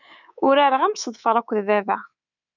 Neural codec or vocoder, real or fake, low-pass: codec, 24 kHz, 3.1 kbps, DualCodec; fake; 7.2 kHz